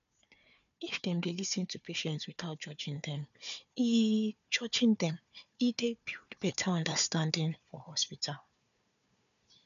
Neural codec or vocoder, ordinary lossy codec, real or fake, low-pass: codec, 16 kHz, 4 kbps, FunCodec, trained on Chinese and English, 50 frames a second; none; fake; 7.2 kHz